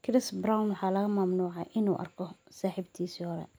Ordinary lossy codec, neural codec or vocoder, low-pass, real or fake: none; none; none; real